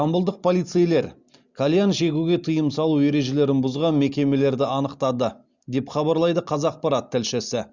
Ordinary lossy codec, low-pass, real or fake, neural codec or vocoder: Opus, 64 kbps; 7.2 kHz; real; none